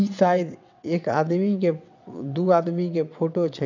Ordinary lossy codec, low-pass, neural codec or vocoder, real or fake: none; 7.2 kHz; vocoder, 22.05 kHz, 80 mel bands, WaveNeXt; fake